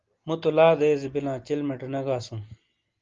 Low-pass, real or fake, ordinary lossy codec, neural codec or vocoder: 7.2 kHz; real; Opus, 32 kbps; none